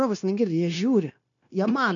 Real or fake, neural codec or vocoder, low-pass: fake; codec, 16 kHz, 0.9 kbps, LongCat-Audio-Codec; 7.2 kHz